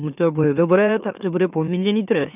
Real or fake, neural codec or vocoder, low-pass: fake; autoencoder, 44.1 kHz, a latent of 192 numbers a frame, MeloTTS; 3.6 kHz